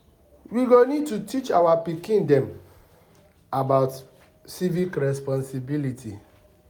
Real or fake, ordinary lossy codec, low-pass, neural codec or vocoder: real; none; none; none